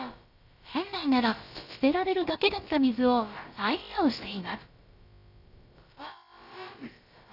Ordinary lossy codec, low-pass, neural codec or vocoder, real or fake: none; 5.4 kHz; codec, 16 kHz, about 1 kbps, DyCAST, with the encoder's durations; fake